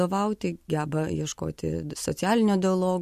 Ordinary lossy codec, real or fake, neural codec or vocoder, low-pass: MP3, 64 kbps; real; none; 14.4 kHz